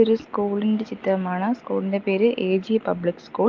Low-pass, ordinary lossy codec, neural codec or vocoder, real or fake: 7.2 kHz; Opus, 32 kbps; none; real